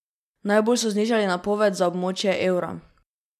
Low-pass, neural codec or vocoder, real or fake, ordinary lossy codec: 14.4 kHz; none; real; none